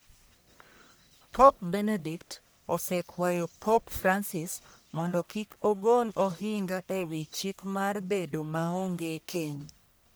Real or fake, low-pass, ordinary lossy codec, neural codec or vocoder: fake; none; none; codec, 44.1 kHz, 1.7 kbps, Pupu-Codec